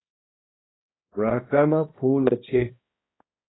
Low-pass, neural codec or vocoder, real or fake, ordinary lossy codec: 7.2 kHz; codec, 16 kHz, 1 kbps, X-Codec, HuBERT features, trained on balanced general audio; fake; AAC, 16 kbps